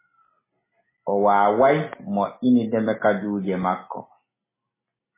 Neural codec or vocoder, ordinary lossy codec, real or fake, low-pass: none; MP3, 16 kbps; real; 3.6 kHz